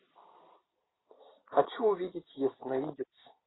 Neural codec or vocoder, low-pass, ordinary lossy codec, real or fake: none; 7.2 kHz; AAC, 16 kbps; real